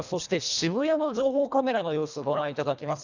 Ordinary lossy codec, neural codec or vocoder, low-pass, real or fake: none; codec, 24 kHz, 1.5 kbps, HILCodec; 7.2 kHz; fake